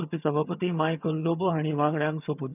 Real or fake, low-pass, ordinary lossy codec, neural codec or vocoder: fake; 3.6 kHz; none; vocoder, 22.05 kHz, 80 mel bands, HiFi-GAN